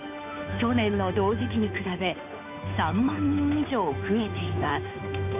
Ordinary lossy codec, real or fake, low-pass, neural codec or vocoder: none; fake; 3.6 kHz; codec, 16 kHz, 2 kbps, FunCodec, trained on Chinese and English, 25 frames a second